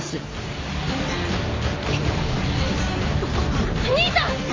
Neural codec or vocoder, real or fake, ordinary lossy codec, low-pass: none; real; MP3, 32 kbps; 7.2 kHz